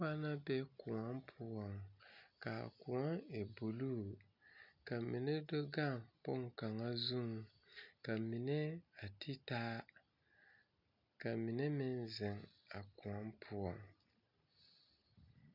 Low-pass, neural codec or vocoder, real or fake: 5.4 kHz; none; real